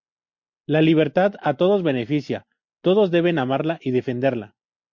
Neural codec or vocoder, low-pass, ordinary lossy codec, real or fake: none; 7.2 kHz; MP3, 48 kbps; real